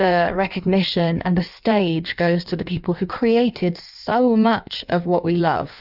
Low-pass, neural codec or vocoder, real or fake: 5.4 kHz; codec, 16 kHz in and 24 kHz out, 1.1 kbps, FireRedTTS-2 codec; fake